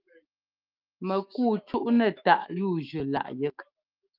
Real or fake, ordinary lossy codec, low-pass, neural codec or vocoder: fake; Opus, 24 kbps; 5.4 kHz; autoencoder, 48 kHz, 128 numbers a frame, DAC-VAE, trained on Japanese speech